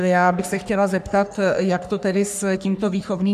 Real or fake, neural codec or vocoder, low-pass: fake; codec, 44.1 kHz, 3.4 kbps, Pupu-Codec; 14.4 kHz